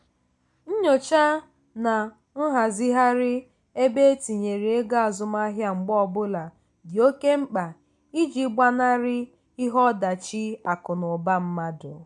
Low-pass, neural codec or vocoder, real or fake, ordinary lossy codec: 10.8 kHz; none; real; MP3, 64 kbps